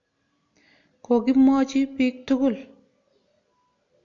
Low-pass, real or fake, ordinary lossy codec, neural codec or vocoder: 7.2 kHz; real; AAC, 48 kbps; none